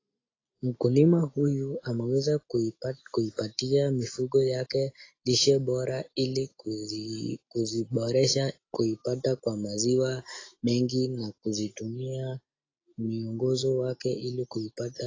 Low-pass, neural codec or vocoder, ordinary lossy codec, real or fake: 7.2 kHz; none; AAC, 32 kbps; real